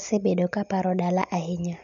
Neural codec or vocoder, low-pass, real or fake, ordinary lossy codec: none; 7.2 kHz; real; none